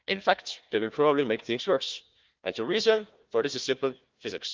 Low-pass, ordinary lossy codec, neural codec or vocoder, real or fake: 7.2 kHz; Opus, 24 kbps; codec, 16 kHz, 1 kbps, FunCodec, trained on Chinese and English, 50 frames a second; fake